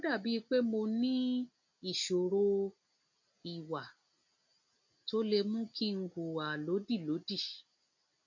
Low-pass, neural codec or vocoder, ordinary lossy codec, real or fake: 7.2 kHz; none; MP3, 48 kbps; real